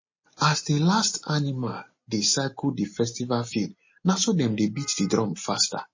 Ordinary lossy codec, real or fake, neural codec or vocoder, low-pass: MP3, 32 kbps; real; none; 7.2 kHz